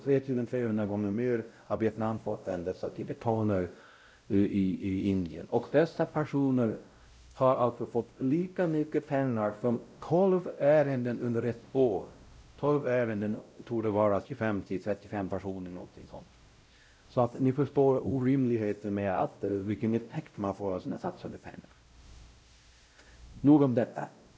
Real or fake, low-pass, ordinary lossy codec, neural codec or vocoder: fake; none; none; codec, 16 kHz, 0.5 kbps, X-Codec, WavLM features, trained on Multilingual LibriSpeech